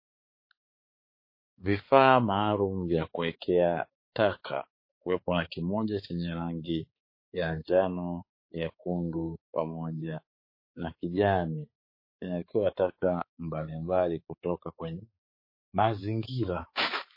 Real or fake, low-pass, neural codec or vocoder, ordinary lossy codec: fake; 5.4 kHz; codec, 16 kHz, 4 kbps, X-Codec, HuBERT features, trained on balanced general audio; MP3, 24 kbps